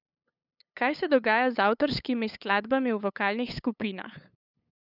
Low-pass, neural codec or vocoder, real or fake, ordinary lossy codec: 5.4 kHz; codec, 16 kHz, 8 kbps, FunCodec, trained on LibriTTS, 25 frames a second; fake; none